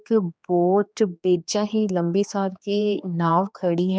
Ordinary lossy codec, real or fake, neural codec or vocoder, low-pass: none; fake; codec, 16 kHz, 2 kbps, X-Codec, HuBERT features, trained on general audio; none